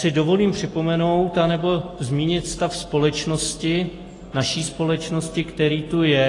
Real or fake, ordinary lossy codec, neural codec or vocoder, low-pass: real; AAC, 32 kbps; none; 10.8 kHz